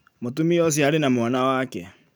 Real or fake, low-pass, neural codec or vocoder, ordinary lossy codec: real; none; none; none